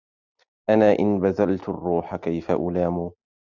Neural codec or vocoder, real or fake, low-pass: none; real; 7.2 kHz